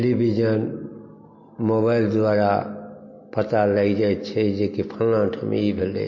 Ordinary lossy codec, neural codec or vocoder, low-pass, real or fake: MP3, 32 kbps; none; 7.2 kHz; real